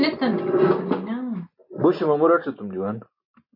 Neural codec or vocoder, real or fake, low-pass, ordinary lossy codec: none; real; 5.4 kHz; MP3, 32 kbps